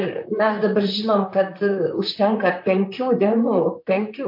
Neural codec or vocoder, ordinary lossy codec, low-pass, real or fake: vocoder, 44.1 kHz, 128 mel bands, Pupu-Vocoder; MP3, 32 kbps; 5.4 kHz; fake